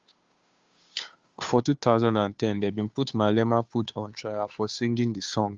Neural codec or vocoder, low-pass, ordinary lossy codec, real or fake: codec, 16 kHz, 2 kbps, FunCodec, trained on Chinese and English, 25 frames a second; 7.2 kHz; Opus, 32 kbps; fake